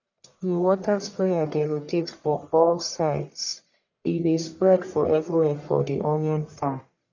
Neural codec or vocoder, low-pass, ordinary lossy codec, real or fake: codec, 44.1 kHz, 1.7 kbps, Pupu-Codec; 7.2 kHz; none; fake